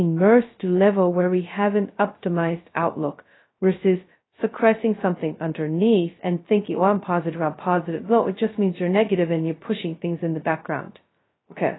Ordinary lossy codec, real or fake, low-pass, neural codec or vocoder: AAC, 16 kbps; fake; 7.2 kHz; codec, 16 kHz, 0.2 kbps, FocalCodec